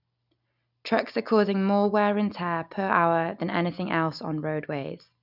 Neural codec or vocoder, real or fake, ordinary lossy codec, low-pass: none; real; none; 5.4 kHz